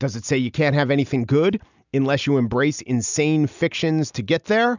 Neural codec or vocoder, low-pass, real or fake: none; 7.2 kHz; real